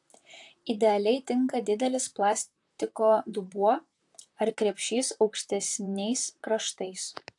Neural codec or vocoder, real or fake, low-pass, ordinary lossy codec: vocoder, 44.1 kHz, 128 mel bands, Pupu-Vocoder; fake; 10.8 kHz; AAC, 64 kbps